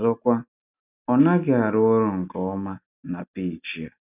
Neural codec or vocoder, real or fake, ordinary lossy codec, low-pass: none; real; none; 3.6 kHz